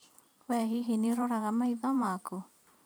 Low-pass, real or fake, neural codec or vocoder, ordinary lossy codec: none; fake; vocoder, 44.1 kHz, 128 mel bands every 512 samples, BigVGAN v2; none